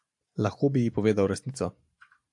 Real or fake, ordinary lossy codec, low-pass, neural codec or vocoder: fake; AAC, 64 kbps; 10.8 kHz; vocoder, 44.1 kHz, 128 mel bands every 512 samples, BigVGAN v2